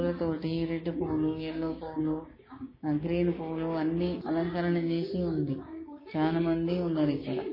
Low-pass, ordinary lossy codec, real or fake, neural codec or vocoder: 5.4 kHz; MP3, 24 kbps; fake; codec, 16 kHz, 6 kbps, DAC